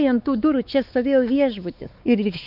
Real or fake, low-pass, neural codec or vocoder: fake; 5.4 kHz; codec, 16 kHz, 4 kbps, X-Codec, WavLM features, trained on Multilingual LibriSpeech